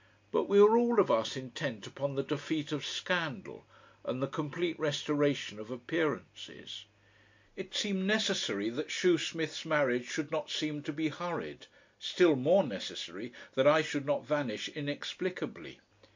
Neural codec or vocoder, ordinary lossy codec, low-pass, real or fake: none; MP3, 48 kbps; 7.2 kHz; real